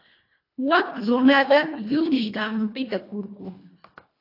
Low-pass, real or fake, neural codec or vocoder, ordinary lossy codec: 5.4 kHz; fake; codec, 24 kHz, 1.5 kbps, HILCodec; AAC, 32 kbps